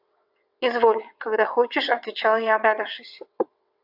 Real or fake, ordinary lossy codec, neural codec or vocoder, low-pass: fake; AAC, 48 kbps; vocoder, 22.05 kHz, 80 mel bands, WaveNeXt; 5.4 kHz